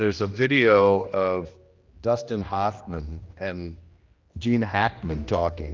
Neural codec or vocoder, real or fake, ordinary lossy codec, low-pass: codec, 16 kHz, 1 kbps, X-Codec, HuBERT features, trained on general audio; fake; Opus, 24 kbps; 7.2 kHz